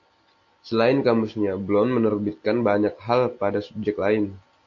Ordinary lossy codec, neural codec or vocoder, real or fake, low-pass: AAC, 48 kbps; none; real; 7.2 kHz